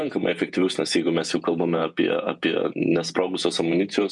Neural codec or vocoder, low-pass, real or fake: vocoder, 48 kHz, 128 mel bands, Vocos; 10.8 kHz; fake